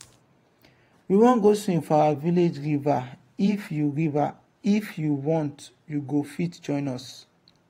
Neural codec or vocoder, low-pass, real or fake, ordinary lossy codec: vocoder, 44.1 kHz, 128 mel bands every 512 samples, BigVGAN v2; 19.8 kHz; fake; AAC, 48 kbps